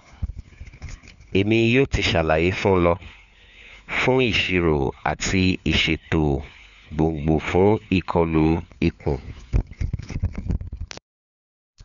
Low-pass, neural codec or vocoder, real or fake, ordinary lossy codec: 7.2 kHz; codec, 16 kHz, 4 kbps, FunCodec, trained on LibriTTS, 50 frames a second; fake; MP3, 96 kbps